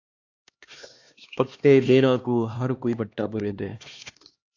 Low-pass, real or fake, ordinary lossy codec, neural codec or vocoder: 7.2 kHz; fake; AAC, 48 kbps; codec, 16 kHz, 2 kbps, X-Codec, HuBERT features, trained on LibriSpeech